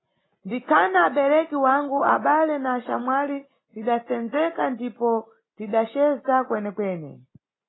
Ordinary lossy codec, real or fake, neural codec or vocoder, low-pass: AAC, 16 kbps; real; none; 7.2 kHz